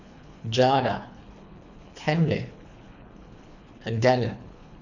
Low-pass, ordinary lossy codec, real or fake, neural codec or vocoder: 7.2 kHz; none; fake; codec, 24 kHz, 3 kbps, HILCodec